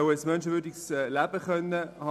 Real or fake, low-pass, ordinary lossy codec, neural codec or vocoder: real; 14.4 kHz; none; none